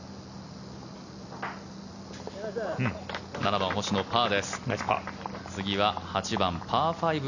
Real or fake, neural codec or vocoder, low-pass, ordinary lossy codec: real; none; 7.2 kHz; none